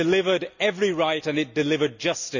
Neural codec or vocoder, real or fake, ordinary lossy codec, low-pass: none; real; none; 7.2 kHz